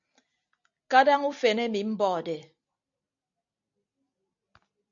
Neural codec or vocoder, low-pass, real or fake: none; 7.2 kHz; real